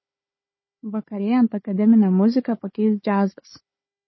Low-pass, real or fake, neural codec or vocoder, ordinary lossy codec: 7.2 kHz; fake; codec, 16 kHz, 4 kbps, FunCodec, trained on Chinese and English, 50 frames a second; MP3, 24 kbps